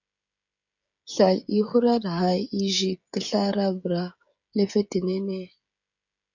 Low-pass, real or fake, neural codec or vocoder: 7.2 kHz; fake; codec, 16 kHz, 16 kbps, FreqCodec, smaller model